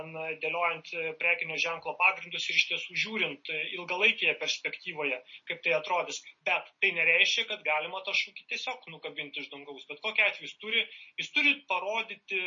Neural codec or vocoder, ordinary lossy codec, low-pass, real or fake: none; MP3, 32 kbps; 7.2 kHz; real